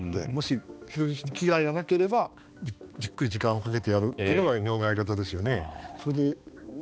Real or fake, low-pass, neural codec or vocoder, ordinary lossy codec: fake; none; codec, 16 kHz, 2 kbps, X-Codec, HuBERT features, trained on balanced general audio; none